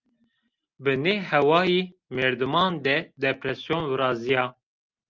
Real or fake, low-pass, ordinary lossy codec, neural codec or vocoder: real; 7.2 kHz; Opus, 24 kbps; none